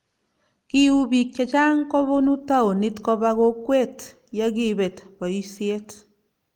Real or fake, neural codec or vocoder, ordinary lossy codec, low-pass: real; none; Opus, 32 kbps; 19.8 kHz